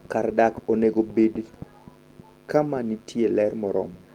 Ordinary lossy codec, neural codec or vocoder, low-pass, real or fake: Opus, 24 kbps; none; 19.8 kHz; real